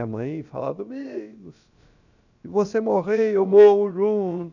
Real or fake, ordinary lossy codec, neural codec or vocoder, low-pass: fake; none; codec, 16 kHz, about 1 kbps, DyCAST, with the encoder's durations; 7.2 kHz